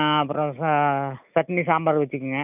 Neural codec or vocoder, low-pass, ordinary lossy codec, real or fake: none; 3.6 kHz; none; real